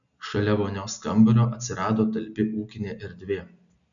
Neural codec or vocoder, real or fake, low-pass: none; real; 7.2 kHz